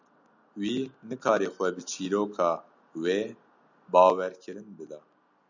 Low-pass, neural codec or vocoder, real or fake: 7.2 kHz; none; real